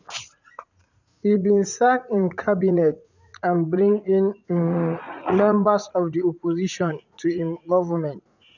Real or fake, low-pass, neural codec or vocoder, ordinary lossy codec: fake; 7.2 kHz; vocoder, 44.1 kHz, 80 mel bands, Vocos; none